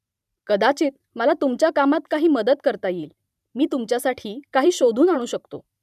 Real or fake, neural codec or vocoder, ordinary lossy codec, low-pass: fake; vocoder, 44.1 kHz, 128 mel bands every 512 samples, BigVGAN v2; none; 14.4 kHz